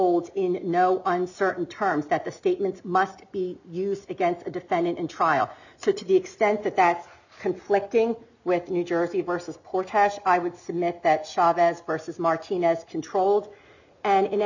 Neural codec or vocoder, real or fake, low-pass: none; real; 7.2 kHz